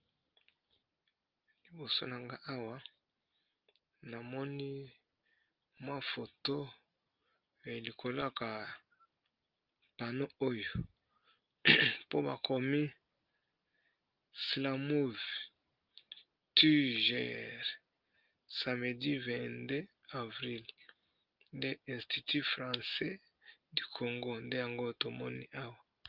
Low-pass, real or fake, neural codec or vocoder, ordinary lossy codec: 5.4 kHz; real; none; Opus, 24 kbps